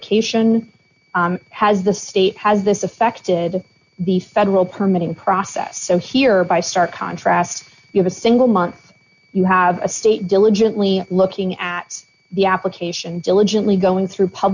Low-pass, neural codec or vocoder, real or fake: 7.2 kHz; none; real